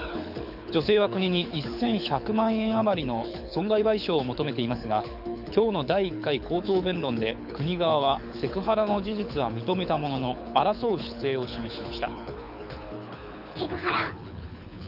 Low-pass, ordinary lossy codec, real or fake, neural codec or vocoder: 5.4 kHz; none; fake; codec, 24 kHz, 6 kbps, HILCodec